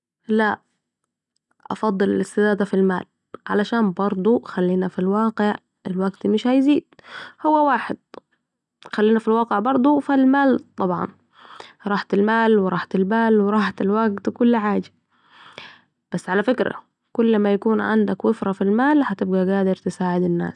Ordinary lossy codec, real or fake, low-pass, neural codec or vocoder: none; real; 10.8 kHz; none